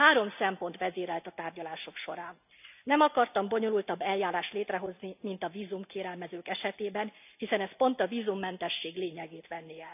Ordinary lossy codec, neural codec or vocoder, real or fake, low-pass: none; none; real; 3.6 kHz